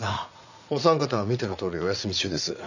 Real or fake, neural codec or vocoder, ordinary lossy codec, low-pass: fake; vocoder, 44.1 kHz, 80 mel bands, Vocos; none; 7.2 kHz